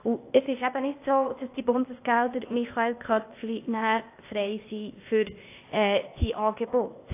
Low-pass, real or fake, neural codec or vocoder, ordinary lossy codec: 3.6 kHz; fake; codec, 16 kHz, 0.8 kbps, ZipCodec; AAC, 24 kbps